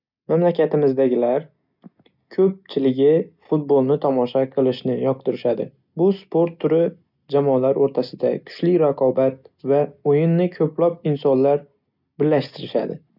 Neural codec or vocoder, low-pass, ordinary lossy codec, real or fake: none; 5.4 kHz; none; real